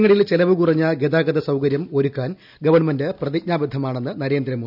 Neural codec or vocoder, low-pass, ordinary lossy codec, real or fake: none; 5.4 kHz; none; real